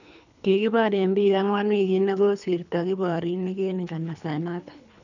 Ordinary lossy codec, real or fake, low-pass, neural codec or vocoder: none; fake; 7.2 kHz; codec, 24 kHz, 3 kbps, HILCodec